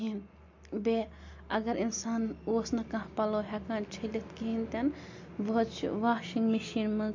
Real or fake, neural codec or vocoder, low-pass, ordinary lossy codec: real; none; 7.2 kHz; MP3, 48 kbps